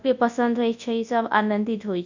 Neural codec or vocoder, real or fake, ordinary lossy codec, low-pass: codec, 24 kHz, 0.9 kbps, WavTokenizer, large speech release; fake; none; 7.2 kHz